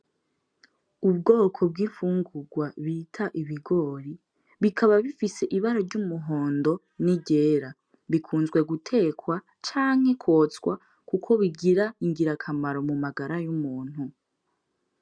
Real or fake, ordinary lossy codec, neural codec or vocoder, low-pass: real; MP3, 96 kbps; none; 9.9 kHz